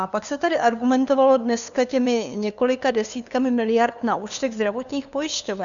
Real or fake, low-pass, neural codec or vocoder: fake; 7.2 kHz; codec, 16 kHz, 2 kbps, FunCodec, trained on LibriTTS, 25 frames a second